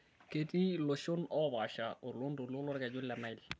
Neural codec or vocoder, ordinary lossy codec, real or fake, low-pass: none; none; real; none